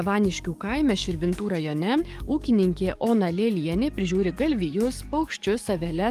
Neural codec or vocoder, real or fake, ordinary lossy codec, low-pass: codec, 44.1 kHz, 7.8 kbps, DAC; fake; Opus, 32 kbps; 14.4 kHz